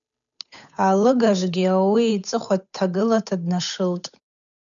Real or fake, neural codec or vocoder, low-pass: fake; codec, 16 kHz, 8 kbps, FunCodec, trained on Chinese and English, 25 frames a second; 7.2 kHz